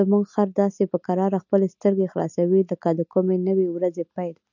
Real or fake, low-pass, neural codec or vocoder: real; 7.2 kHz; none